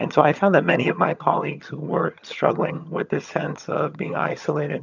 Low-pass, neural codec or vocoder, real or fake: 7.2 kHz; vocoder, 22.05 kHz, 80 mel bands, HiFi-GAN; fake